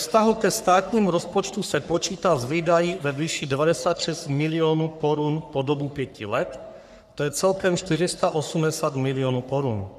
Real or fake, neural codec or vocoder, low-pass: fake; codec, 44.1 kHz, 3.4 kbps, Pupu-Codec; 14.4 kHz